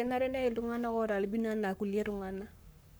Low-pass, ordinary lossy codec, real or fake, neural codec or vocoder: none; none; fake; codec, 44.1 kHz, 7.8 kbps, Pupu-Codec